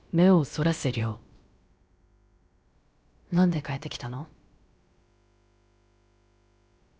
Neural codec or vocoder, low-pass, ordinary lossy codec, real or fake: codec, 16 kHz, about 1 kbps, DyCAST, with the encoder's durations; none; none; fake